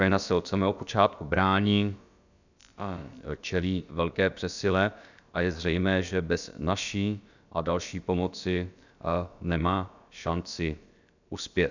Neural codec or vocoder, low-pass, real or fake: codec, 16 kHz, about 1 kbps, DyCAST, with the encoder's durations; 7.2 kHz; fake